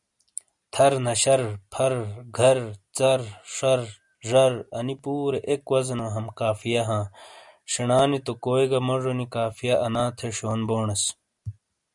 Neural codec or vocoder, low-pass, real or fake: none; 10.8 kHz; real